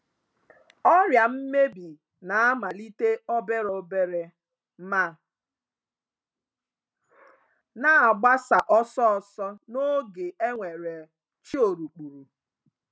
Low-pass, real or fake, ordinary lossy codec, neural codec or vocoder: none; real; none; none